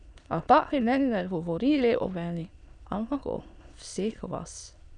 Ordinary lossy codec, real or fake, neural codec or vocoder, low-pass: none; fake; autoencoder, 22.05 kHz, a latent of 192 numbers a frame, VITS, trained on many speakers; 9.9 kHz